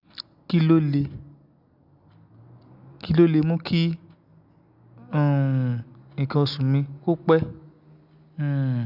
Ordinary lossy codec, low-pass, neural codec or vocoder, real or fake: none; 5.4 kHz; none; real